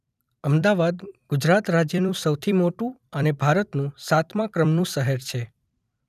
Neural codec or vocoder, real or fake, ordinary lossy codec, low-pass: vocoder, 44.1 kHz, 128 mel bands every 256 samples, BigVGAN v2; fake; none; 14.4 kHz